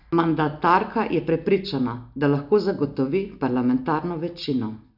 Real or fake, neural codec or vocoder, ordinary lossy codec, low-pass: real; none; none; 5.4 kHz